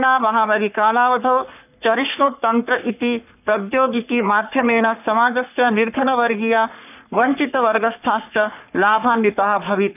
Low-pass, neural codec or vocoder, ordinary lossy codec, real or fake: 3.6 kHz; codec, 44.1 kHz, 3.4 kbps, Pupu-Codec; none; fake